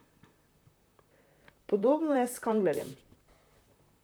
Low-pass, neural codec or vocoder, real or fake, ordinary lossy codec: none; vocoder, 44.1 kHz, 128 mel bands, Pupu-Vocoder; fake; none